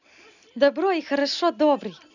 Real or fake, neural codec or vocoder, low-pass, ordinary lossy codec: real; none; 7.2 kHz; none